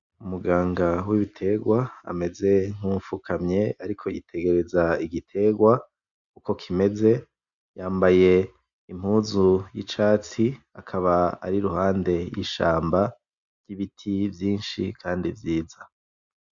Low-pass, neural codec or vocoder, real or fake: 7.2 kHz; none; real